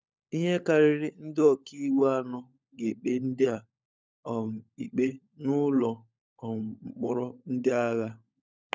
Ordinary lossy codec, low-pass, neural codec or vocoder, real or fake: none; none; codec, 16 kHz, 16 kbps, FunCodec, trained on LibriTTS, 50 frames a second; fake